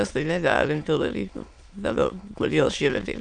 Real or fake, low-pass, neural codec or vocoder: fake; 9.9 kHz; autoencoder, 22.05 kHz, a latent of 192 numbers a frame, VITS, trained on many speakers